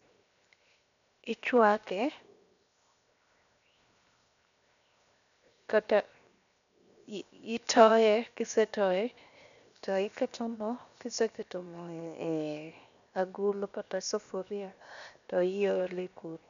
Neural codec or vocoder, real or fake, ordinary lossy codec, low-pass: codec, 16 kHz, 0.7 kbps, FocalCodec; fake; none; 7.2 kHz